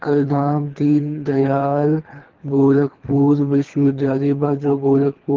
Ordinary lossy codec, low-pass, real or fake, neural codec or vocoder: Opus, 16 kbps; 7.2 kHz; fake; codec, 24 kHz, 3 kbps, HILCodec